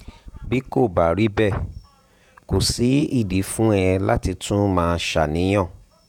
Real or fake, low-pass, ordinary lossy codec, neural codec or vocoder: fake; 19.8 kHz; none; vocoder, 48 kHz, 128 mel bands, Vocos